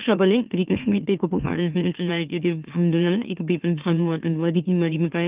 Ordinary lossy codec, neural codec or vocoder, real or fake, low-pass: Opus, 24 kbps; autoencoder, 44.1 kHz, a latent of 192 numbers a frame, MeloTTS; fake; 3.6 kHz